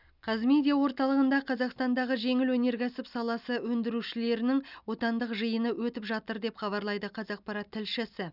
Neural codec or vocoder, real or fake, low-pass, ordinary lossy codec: none; real; 5.4 kHz; none